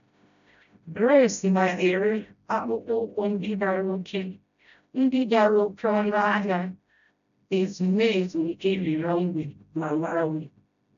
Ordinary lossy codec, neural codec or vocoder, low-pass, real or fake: none; codec, 16 kHz, 0.5 kbps, FreqCodec, smaller model; 7.2 kHz; fake